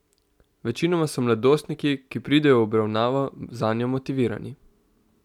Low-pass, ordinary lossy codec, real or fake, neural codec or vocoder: 19.8 kHz; none; real; none